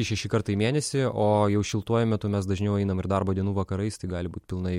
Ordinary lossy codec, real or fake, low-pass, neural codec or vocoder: MP3, 64 kbps; real; 19.8 kHz; none